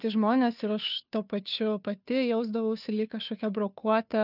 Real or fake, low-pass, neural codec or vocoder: fake; 5.4 kHz; codec, 16 kHz, 2 kbps, FunCodec, trained on Chinese and English, 25 frames a second